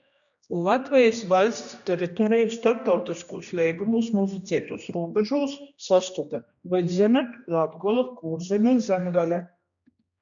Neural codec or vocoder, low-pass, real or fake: codec, 16 kHz, 1 kbps, X-Codec, HuBERT features, trained on general audio; 7.2 kHz; fake